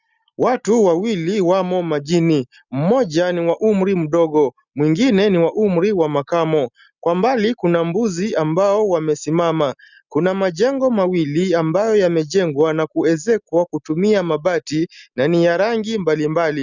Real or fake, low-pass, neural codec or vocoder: real; 7.2 kHz; none